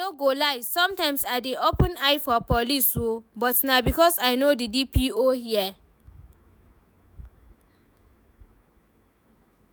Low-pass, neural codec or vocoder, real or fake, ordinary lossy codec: none; autoencoder, 48 kHz, 128 numbers a frame, DAC-VAE, trained on Japanese speech; fake; none